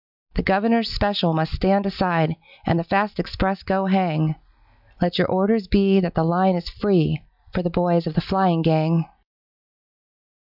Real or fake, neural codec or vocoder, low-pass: real; none; 5.4 kHz